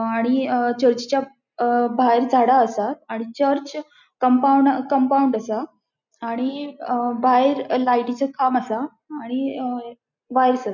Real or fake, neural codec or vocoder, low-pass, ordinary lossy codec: real; none; 7.2 kHz; none